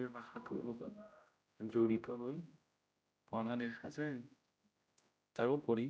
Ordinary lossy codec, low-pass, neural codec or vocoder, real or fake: none; none; codec, 16 kHz, 0.5 kbps, X-Codec, HuBERT features, trained on general audio; fake